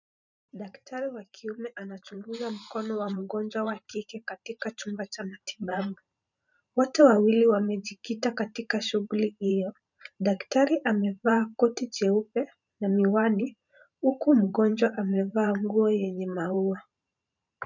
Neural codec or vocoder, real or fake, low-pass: vocoder, 44.1 kHz, 128 mel bands every 512 samples, BigVGAN v2; fake; 7.2 kHz